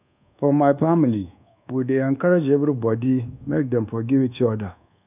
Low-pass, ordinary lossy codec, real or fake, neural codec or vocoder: 3.6 kHz; none; fake; codec, 24 kHz, 1.2 kbps, DualCodec